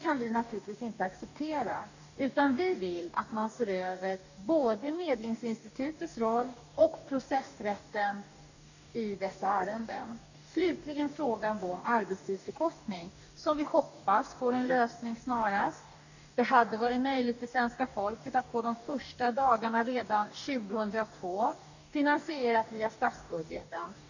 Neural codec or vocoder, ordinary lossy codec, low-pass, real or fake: codec, 44.1 kHz, 2.6 kbps, DAC; none; 7.2 kHz; fake